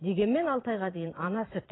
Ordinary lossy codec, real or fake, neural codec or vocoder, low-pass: AAC, 16 kbps; fake; vocoder, 44.1 kHz, 80 mel bands, Vocos; 7.2 kHz